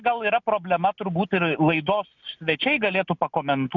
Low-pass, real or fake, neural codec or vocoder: 7.2 kHz; real; none